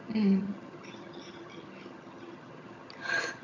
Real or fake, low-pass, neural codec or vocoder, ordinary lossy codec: fake; 7.2 kHz; vocoder, 22.05 kHz, 80 mel bands, HiFi-GAN; AAC, 48 kbps